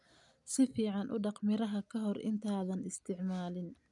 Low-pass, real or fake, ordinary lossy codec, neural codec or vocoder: 10.8 kHz; real; none; none